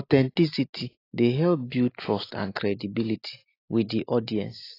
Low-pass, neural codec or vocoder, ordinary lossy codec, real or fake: 5.4 kHz; none; AAC, 24 kbps; real